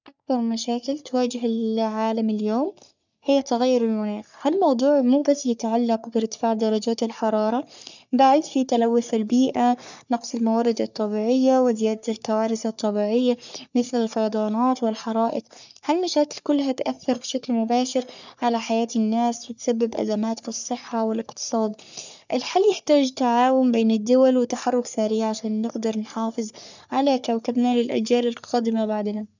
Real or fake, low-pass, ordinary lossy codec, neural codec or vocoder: fake; 7.2 kHz; none; codec, 44.1 kHz, 3.4 kbps, Pupu-Codec